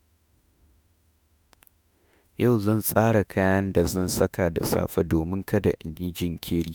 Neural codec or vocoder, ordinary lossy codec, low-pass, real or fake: autoencoder, 48 kHz, 32 numbers a frame, DAC-VAE, trained on Japanese speech; none; none; fake